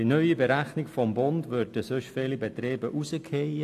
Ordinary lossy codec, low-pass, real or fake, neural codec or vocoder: AAC, 64 kbps; 14.4 kHz; real; none